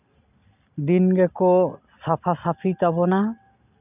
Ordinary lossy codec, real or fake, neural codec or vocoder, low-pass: none; real; none; 3.6 kHz